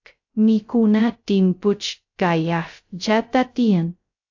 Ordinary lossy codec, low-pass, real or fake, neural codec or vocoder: AAC, 48 kbps; 7.2 kHz; fake; codec, 16 kHz, 0.2 kbps, FocalCodec